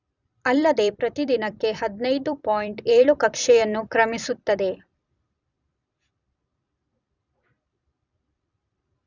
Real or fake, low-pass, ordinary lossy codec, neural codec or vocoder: fake; 7.2 kHz; none; vocoder, 44.1 kHz, 128 mel bands every 256 samples, BigVGAN v2